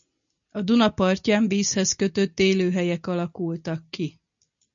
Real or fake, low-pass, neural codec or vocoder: real; 7.2 kHz; none